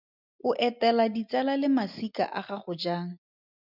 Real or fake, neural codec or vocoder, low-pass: real; none; 5.4 kHz